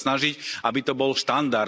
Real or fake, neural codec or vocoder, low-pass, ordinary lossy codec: real; none; none; none